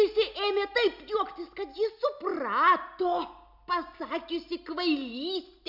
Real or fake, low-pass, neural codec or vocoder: real; 5.4 kHz; none